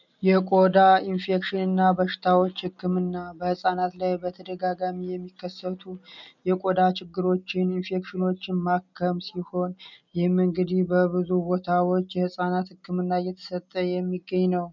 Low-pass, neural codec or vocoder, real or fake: 7.2 kHz; none; real